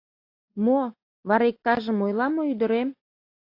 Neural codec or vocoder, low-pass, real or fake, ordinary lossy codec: none; 5.4 kHz; real; AAC, 32 kbps